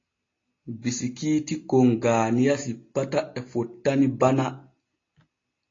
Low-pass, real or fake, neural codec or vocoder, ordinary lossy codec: 7.2 kHz; real; none; AAC, 32 kbps